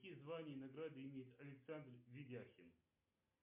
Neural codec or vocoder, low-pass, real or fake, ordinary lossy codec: none; 3.6 kHz; real; MP3, 32 kbps